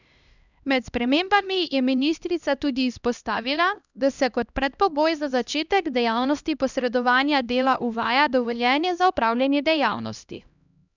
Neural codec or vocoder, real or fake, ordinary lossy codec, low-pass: codec, 16 kHz, 1 kbps, X-Codec, HuBERT features, trained on LibriSpeech; fake; none; 7.2 kHz